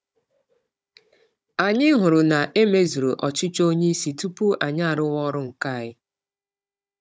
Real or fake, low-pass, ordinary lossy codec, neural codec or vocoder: fake; none; none; codec, 16 kHz, 16 kbps, FunCodec, trained on Chinese and English, 50 frames a second